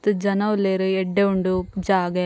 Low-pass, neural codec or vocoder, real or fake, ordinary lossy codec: none; none; real; none